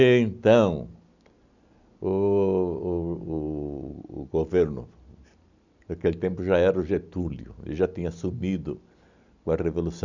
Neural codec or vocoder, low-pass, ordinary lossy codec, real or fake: none; 7.2 kHz; none; real